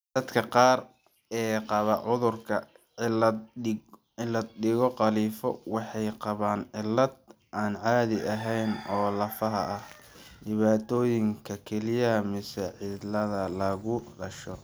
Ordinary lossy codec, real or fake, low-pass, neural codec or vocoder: none; real; none; none